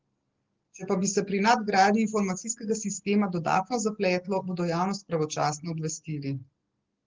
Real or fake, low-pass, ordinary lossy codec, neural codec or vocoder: real; 7.2 kHz; Opus, 16 kbps; none